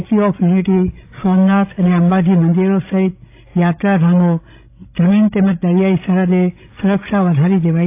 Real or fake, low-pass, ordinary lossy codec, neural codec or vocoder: fake; 3.6 kHz; AAC, 24 kbps; codec, 16 kHz, 16 kbps, FreqCodec, larger model